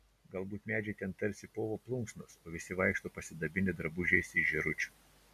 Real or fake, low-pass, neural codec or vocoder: real; 14.4 kHz; none